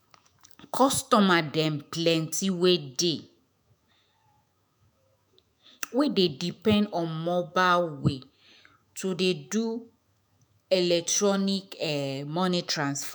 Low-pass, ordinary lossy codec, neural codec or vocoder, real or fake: none; none; autoencoder, 48 kHz, 128 numbers a frame, DAC-VAE, trained on Japanese speech; fake